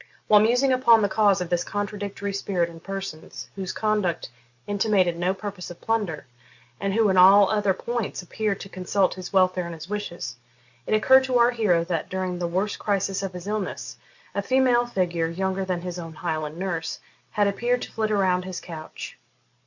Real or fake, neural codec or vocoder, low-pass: real; none; 7.2 kHz